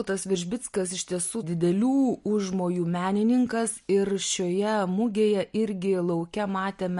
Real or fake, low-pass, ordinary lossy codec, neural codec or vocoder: real; 14.4 kHz; MP3, 48 kbps; none